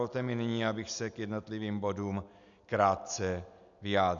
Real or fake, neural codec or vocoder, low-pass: real; none; 7.2 kHz